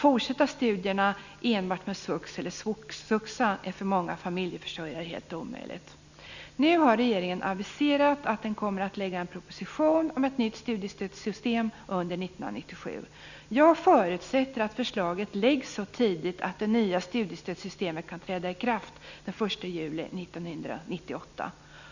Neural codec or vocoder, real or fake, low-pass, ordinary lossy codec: none; real; 7.2 kHz; none